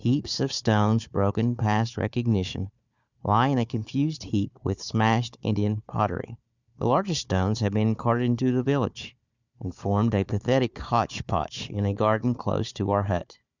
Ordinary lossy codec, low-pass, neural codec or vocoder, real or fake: Opus, 64 kbps; 7.2 kHz; codec, 16 kHz, 4 kbps, FunCodec, trained on Chinese and English, 50 frames a second; fake